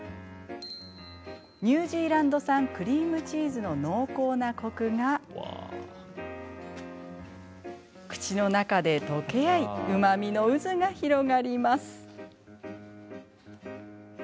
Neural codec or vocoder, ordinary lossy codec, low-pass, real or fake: none; none; none; real